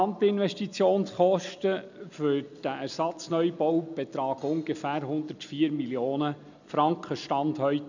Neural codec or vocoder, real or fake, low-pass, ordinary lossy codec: none; real; 7.2 kHz; none